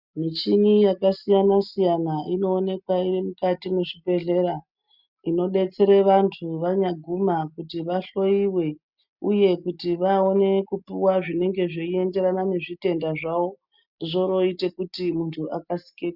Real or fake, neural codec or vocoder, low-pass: real; none; 5.4 kHz